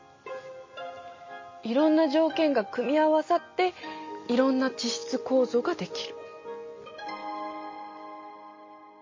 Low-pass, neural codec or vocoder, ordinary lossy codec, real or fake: 7.2 kHz; none; MP3, 32 kbps; real